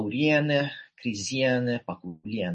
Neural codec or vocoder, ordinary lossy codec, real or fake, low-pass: none; MP3, 32 kbps; real; 10.8 kHz